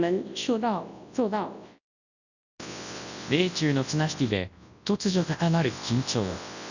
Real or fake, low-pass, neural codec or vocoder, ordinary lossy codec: fake; 7.2 kHz; codec, 24 kHz, 0.9 kbps, WavTokenizer, large speech release; none